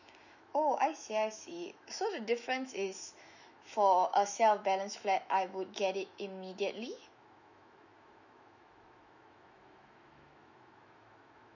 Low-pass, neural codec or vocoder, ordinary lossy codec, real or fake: 7.2 kHz; autoencoder, 48 kHz, 128 numbers a frame, DAC-VAE, trained on Japanese speech; none; fake